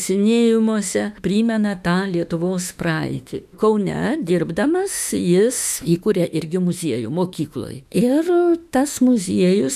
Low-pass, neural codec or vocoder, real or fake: 14.4 kHz; autoencoder, 48 kHz, 32 numbers a frame, DAC-VAE, trained on Japanese speech; fake